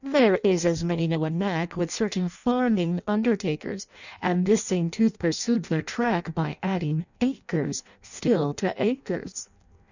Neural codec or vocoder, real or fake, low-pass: codec, 16 kHz in and 24 kHz out, 0.6 kbps, FireRedTTS-2 codec; fake; 7.2 kHz